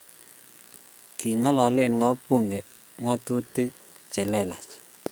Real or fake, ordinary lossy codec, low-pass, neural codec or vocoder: fake; none; none; codec, 44.1 kHz, 2.6 kbps, SNAC